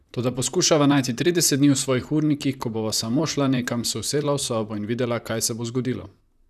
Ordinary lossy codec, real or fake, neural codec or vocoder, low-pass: none; fake; vocoder, 44.1 kHz, 128 mel bands, Pupu-Vocoder; 14.4 kHz